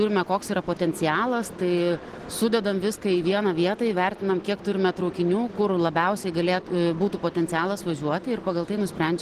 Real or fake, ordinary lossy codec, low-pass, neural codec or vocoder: real; Opus, 16 kbps; 14.4 kHz; none